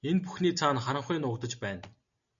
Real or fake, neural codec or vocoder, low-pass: real; none; 7.2 kHz